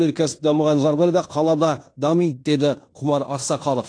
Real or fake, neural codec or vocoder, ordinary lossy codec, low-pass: fake; codec, 16 kHz in and 24 kHz out, 0.9 kbps, LongCat-Audio-Codec, fine tuned four codebook decoder; AAC, 48 kbps; 9.9 kHz